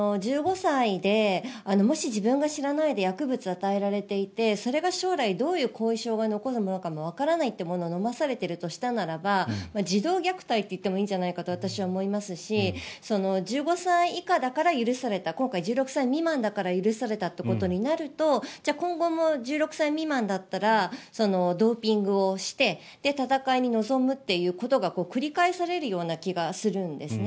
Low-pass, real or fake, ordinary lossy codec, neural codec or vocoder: none; real; none; none